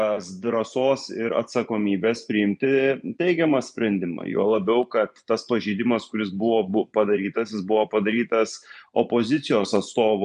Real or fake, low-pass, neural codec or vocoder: fake; 10.8 kHz; vocoder, 24 kHz, 100 mel bands, Vocos